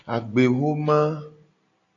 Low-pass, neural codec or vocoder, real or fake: 7.2 kHz; none; real